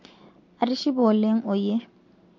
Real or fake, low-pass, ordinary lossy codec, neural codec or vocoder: real; 7.2 kHz; MP3, 48 kbps; none